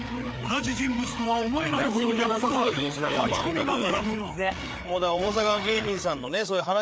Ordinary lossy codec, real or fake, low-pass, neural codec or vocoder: none; fake; none; codec, 16 kHz, 4 kbps, FreqCodec, larger model